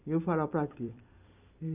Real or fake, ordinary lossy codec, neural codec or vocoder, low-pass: fake; none; vocoder, 44.1 kHz, 128 mel bands every 256 samples, BigVGAN v2; 3.6 kHz